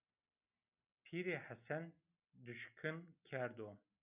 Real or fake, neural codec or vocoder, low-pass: real; none; 3.6 kHz